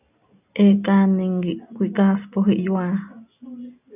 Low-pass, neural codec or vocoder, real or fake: 3.6 kHz; none; real